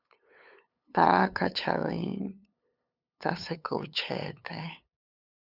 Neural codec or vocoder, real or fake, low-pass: codec, 16 kHz, 8 kbps, FunCodec, trained on LibriTTS, 25 frames a second; fake; 5.4 kHz